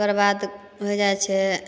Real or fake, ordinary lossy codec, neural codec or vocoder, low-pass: real; none; none; none